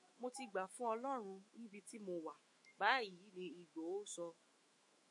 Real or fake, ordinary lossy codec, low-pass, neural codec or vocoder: fake; MP3, 48 kbps; 10.8 kHz; autoencoder, 48 kHz, 128 numbers a frame, DAC-VAE, trained on Japanese speech